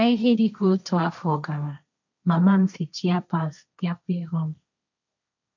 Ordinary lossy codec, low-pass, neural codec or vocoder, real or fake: none; 7.2 kHz; codec, 16 kHz, 1.1 kbps, Voila-Tokenizer; fake